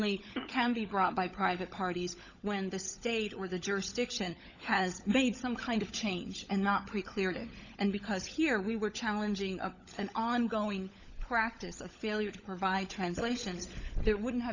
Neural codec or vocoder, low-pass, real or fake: codec, 16 kHz, 16 kbps, FunCodec, trained on LibriTTS, 50 frames a second; 7.2 kHz; fake